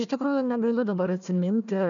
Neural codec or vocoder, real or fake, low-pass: codec, 16 kHz, 1 kbps, FunCodec, trained on Chinese and English, 50 frames a second; fake; 7.2 kHz